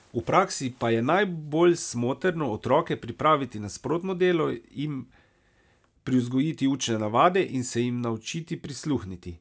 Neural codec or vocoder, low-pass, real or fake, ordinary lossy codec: none; none; real; none